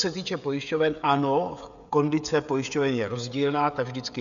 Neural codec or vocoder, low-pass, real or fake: codec, 16 kHz, 16 kbps, FreqCodec, smaller model; 7.2 kHz; fake